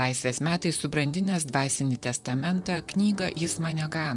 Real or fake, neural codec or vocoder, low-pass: fake; vocoder, 44.1 kHz, 128 mel bands, Pupu-Vocoder; 10.8 kHz